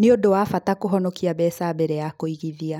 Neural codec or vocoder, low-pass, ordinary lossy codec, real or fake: none; 19.8 kHz; none; real